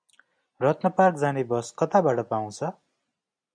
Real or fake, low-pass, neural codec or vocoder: real; 9.9 kHz; none